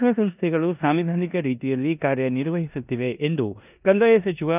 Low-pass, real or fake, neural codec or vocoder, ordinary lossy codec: 3.6 kHz; fake; codec, 16 kHz in and 24 kHz out, 0.9 kbps, LongCat-Audio-Codec, four codebook decoder; none